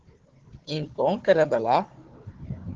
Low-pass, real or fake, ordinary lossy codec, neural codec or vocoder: 7.2 kHz; fake; Opus, 16 kbps; codec, 16 kHz, 4 kbps, FunCodec, trained on Chinese and English, 50 frames a second